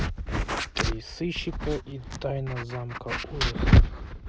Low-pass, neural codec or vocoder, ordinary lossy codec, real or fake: none; none; none; real